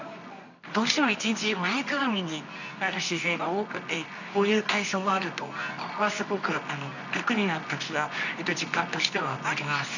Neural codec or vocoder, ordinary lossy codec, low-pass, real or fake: codec, 24 kHz, 0.9 kbps, WavTokenizer, medium music audio release; none; 7.2 kHz; fake